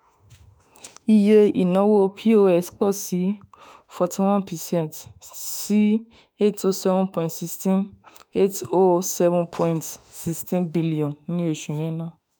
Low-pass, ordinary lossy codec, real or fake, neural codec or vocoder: none; none; fake; autoencoder, 48 kHz, 32 numbers a frame, DAC-VAE, trained on Japanese speech